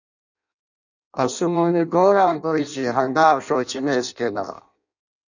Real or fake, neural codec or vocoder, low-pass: fake; codec, 16 kHz in and 24 kHz out, 0.6 kbps, FireRedTTS-2 codec; 7.2 kHz